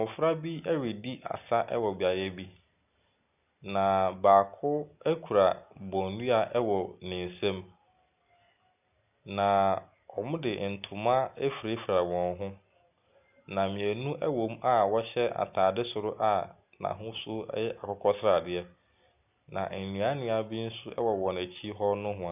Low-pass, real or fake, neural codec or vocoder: 3.6 kHz; real; none